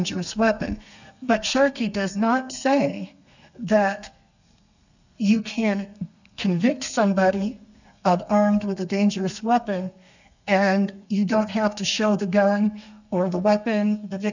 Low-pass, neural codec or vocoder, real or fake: 7.2 kHz; codec, 32 kHz, 1.9 kbps, SNAC; fake